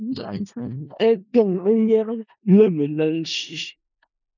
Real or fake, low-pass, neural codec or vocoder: fake; 7.2 kHz; codec, 16 kHz in and 24 kHz out, 0.4 kbps, LongCat-Audio-Codec, four codebook decoder